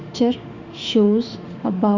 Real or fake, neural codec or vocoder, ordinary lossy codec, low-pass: fake; autoencoder, 48 kHz, 32 numbers a frame, DAC-VAE, trained on Japanese speech; none; 7.2 kHz